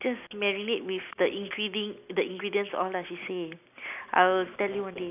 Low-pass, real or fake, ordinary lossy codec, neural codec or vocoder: 3.6 kHz; real; none; none